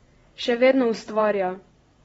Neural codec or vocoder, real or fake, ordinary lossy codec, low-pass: vocoder, 22.05 kHz, 80 mel bands, WaveNeXt; fake; AAC, 24 kbps; 9.9 kHz